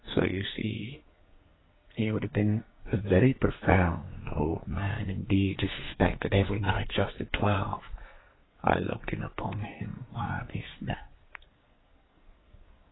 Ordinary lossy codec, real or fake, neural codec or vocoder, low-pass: AAC, 16 kbps; fake; codec, 44.1 kHz, 2.6 kbps, SNAC; 7.2 kHz